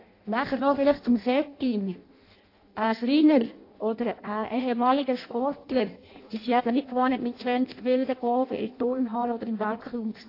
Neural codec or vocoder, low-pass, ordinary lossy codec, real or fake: codec, 16 kHz in and 24 kHz out, 0.6 kbps, FireRedTTS-2 codec; 5.4 kHz; AAC, 32 kbps; fake